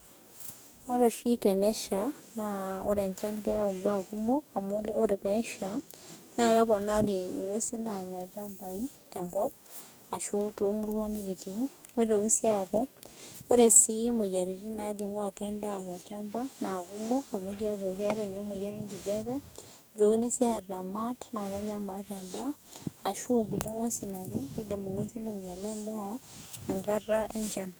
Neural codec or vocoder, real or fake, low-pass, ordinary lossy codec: codec, 44.1 kHz, 2.6 kbps, DAC; fake; none; none